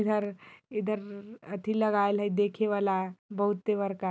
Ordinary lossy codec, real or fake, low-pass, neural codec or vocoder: none; real; none; none